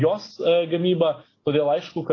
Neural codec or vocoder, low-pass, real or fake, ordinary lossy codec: none; 7.2 kHz; real; AAC, 32 kbps